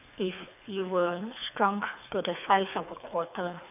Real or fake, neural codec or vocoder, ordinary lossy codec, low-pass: fake; codec, 24 kHz, 3 kbps, HILCodec; none; 3.6 kHz